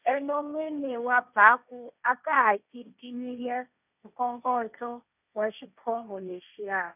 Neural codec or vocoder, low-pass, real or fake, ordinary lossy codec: codec, 16 kHz, 1.1 kbps, Voila-Tokenizer; 3.6 kHz; fake; none